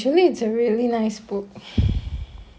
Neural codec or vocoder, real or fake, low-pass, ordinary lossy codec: none; real; none; none